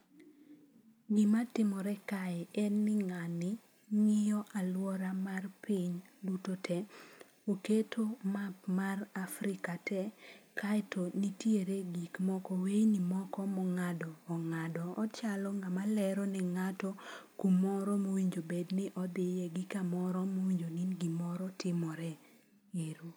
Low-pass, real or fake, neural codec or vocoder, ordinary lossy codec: none; real; none; none